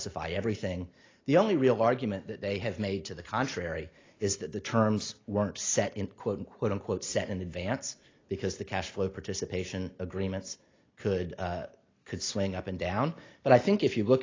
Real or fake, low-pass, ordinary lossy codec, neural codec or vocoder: real; 7.2 kHz; AAC, 32 kbps; none